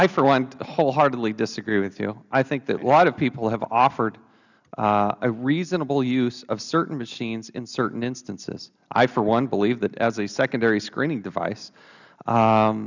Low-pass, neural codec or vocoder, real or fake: 7.2 kHz; none; real